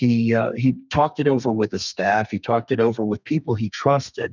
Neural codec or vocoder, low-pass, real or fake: codec, 32 kHz, 1.9 kbps, SNAC; 7.2 kHz; fake